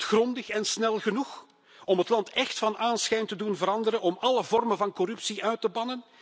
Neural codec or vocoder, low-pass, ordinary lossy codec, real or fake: none; none; none; real